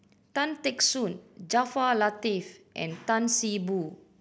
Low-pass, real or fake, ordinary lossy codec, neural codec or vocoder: none; real; none; none